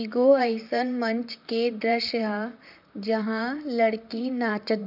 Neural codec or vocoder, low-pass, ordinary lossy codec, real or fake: vocoder, 44.1 kHz, 128 mel bands, Pupu-Vocoder; 5.4 kHz; none; fake